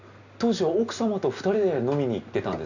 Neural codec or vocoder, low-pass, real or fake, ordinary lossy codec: none; 7.2 kHz; real; none